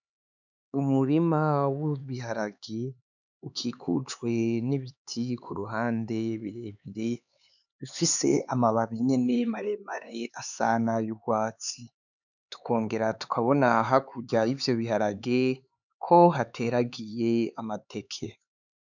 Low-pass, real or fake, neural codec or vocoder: 7.2 kHz; fake; codec, 16 kHz, 4 kbps, X-Codec, HuBERT features, trained on LibriSpeech